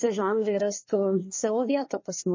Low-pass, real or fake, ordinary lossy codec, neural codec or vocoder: 7.2 kHz; fake; MP3, 32 kbps; codec, 24 kHz, 1 kbps, SNAC